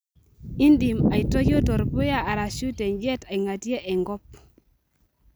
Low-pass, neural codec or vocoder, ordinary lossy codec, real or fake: none; none; none; real